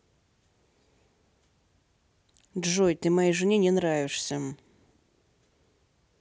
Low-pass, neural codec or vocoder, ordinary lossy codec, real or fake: none; none; none; real